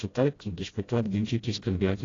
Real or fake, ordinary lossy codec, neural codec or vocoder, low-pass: fake; AAC, 32 kbps; codec, 16 kHz, 0.5 kbps, FreqCodec, smaller model; 7.2 kHz